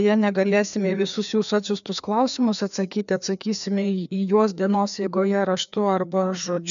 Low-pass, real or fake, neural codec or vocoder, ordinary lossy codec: 7.2 kHz; fake; codec, 16 kHz, 2 kbps, FreqCodec, larger model; MP3, 96 kbps